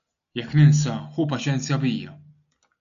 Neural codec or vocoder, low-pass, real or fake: none; 7.2 kHz; real